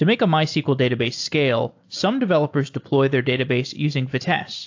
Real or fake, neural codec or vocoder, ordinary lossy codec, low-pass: real; none; AAC, 48 kbps; 7.2 kHz